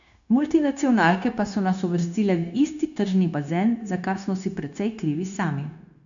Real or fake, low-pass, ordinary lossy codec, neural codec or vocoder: fake; 7.2 kHz; none; codec, 16 kHz, 0.9 kbps, LongCat-Audio-Codec